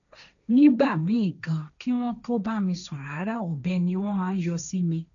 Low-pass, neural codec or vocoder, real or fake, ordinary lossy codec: 7.2 kHz; codec, 16 kHz, 1.1 kbps, Voila-Tokenizer; fake; none